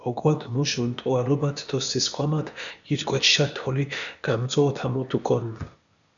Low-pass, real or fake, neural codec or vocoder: 7.2 kHz; fake; codec, 16 kHz, 0.8 kbps, ZipCodec